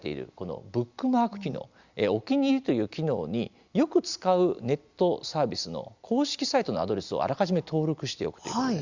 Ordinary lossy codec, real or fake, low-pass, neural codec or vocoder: Opus, 64 kbps; real; 7.2 kHz; none